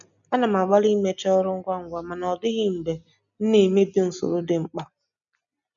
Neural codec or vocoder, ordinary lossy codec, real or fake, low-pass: none; none; real; 7.2 kHz